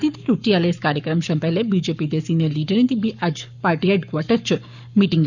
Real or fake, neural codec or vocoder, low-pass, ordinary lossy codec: fake; codec, 44.1 kHz, 7.8 kbps, Pupu-Codec; 7.2 kHz; none